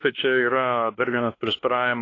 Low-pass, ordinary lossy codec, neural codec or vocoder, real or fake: 7.2 kHz; AAC, 32 kbps; codec, 16 kHz, 1 kbps, X-Codec, WavLM features, trained on Multilingual LibriSpeech; fake